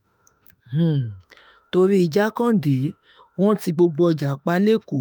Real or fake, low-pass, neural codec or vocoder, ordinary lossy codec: fake; none; autoencoder, 48 kHz, 32 numbers a frame, DAC-VAE, trained on Japanese speech; none